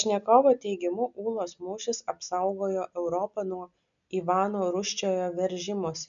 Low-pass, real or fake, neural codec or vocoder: 7.2 kHz; real; none